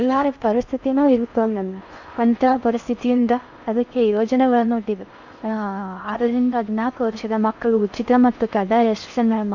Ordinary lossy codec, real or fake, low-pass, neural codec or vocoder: none; fake; 7.2 kHz; codec, 16 kHz in and 24 kHz out, 0.6 kbps, FocalCodec, streaming, 4096 codes